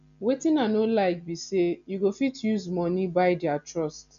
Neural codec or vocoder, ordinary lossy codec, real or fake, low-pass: none; none; real; 7.2 kHz